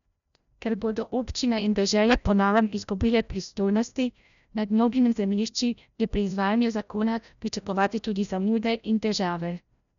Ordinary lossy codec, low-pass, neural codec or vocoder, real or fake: none; 7.2 kHz; codec, 16 kHz, 0.5 kbps, FreqCodec, larger model; fake